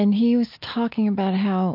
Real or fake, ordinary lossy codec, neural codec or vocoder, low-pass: real; MP3, 48 kbps; none; 5.4 kHz